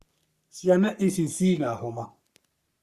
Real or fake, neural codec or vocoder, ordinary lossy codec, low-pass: fake; codec, 44.1 kHz, 3.4 kbps, Pupu-Codec; Opus, 64 kbps; 14.4 kHz